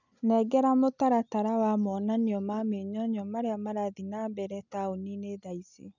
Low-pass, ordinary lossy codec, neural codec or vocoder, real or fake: 7.2 kHz; none; none; real